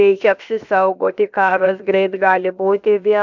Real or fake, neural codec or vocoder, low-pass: fake; codec, 16 kHz, about 1 kbps, DyCAST, with the encoder's durations; 7.2 kHz